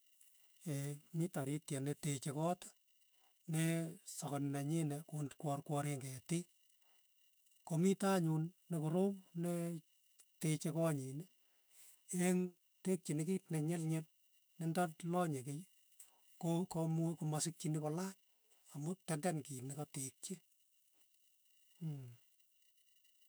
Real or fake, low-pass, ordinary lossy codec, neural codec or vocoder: fake; none; none; autoencoder, 48 kHz, 128 numbers a frame, DAC-VAE, trained on Japanese speech